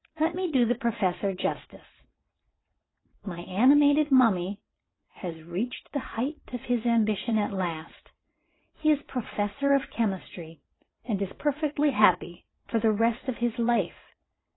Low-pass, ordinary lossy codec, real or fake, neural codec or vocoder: 7.2 kHz; AAC, 16 kbps; fake; vocoder, 22.05 kHz, 80 mel bands, WaveNeXt